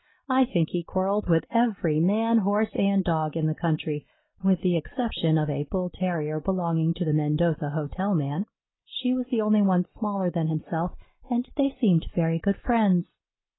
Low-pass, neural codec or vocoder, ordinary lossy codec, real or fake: 7.2 kHz; none; AAC, 16 kbps; real